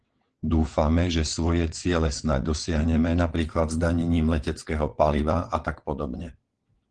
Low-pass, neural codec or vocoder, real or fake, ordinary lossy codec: 9.9 kHz; vocoder, 22.05 kHz, 80 mel bands, WaveNeXt; fake; Opus, 16 kbps